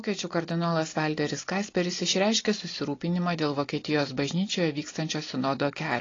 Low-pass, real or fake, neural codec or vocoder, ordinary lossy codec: 7.2 kHz; real; none; AAC, 32 kbps